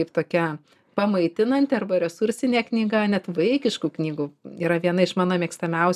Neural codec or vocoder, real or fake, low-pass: none; real; 14.4 kHz